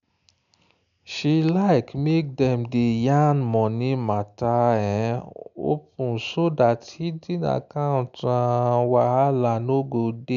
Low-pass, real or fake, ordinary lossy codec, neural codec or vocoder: 7.2 kHz; real; none; none